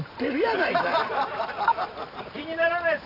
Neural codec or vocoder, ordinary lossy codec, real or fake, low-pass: vocoder, 44.1 kHz, 128 mel bands every 512 samples, BigVGAN v2; none; fake; 5.4 kHz